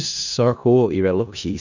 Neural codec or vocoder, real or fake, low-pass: codec, 16 kHz in and 24 kHz out, 0.4 kbps, LongCat-Audio-Codec, four codebook decoder; fake; 7.2 kHz